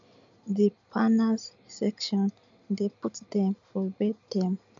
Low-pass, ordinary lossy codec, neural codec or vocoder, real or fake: 7.2 kHz; none; none; real